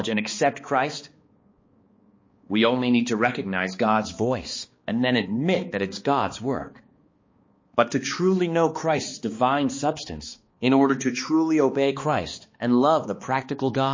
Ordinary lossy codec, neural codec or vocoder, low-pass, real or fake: MP3, 32 kbps; codec, 16 kHz, 2 kbps, X-Codec, HuBERT features, trained on balanced general audio; 7.2 kHz; fake